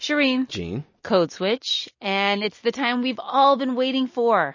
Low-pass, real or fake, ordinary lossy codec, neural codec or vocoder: 7.2 kHz; real; MP3, 32 kbps; none